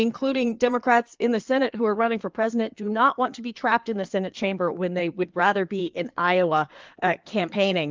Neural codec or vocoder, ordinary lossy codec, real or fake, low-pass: codec, 16 kHz in and 24 kHz out, 2.2 kbps, FireRedTTS-2 codec; Opus, 24 kbps; fake; 7.2 kHz